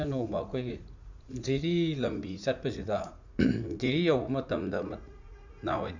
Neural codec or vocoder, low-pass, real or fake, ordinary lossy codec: vocoder, 44.1 kHz, 80 mel bands, Vocos; 7.2 kHz; fake; none